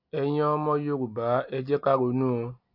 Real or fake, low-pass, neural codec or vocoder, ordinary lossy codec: real; 5.4 kHz; none; AAC, 48 kbps